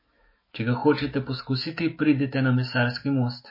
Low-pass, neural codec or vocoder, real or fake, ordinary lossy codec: 5.4 kHz; autoencoder, 48 kHz, 128 numbers a frame, DAC-VAE, trained on Japanese speech; fake; MP3, 24 kbps